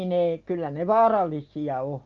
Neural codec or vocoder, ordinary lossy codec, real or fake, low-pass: none; Opus, 32 kbps; real; 7.2 kHz